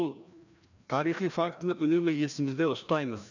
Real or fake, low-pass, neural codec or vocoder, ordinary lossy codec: fake; 7.2 kHz; codec, 16 kHz, 1 kbps, FreqCodec, larger model; none